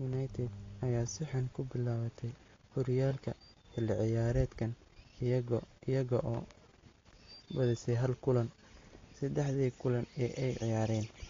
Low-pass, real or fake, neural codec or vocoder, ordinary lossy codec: 7.2 kHz; real; none; AAC, 32 kbps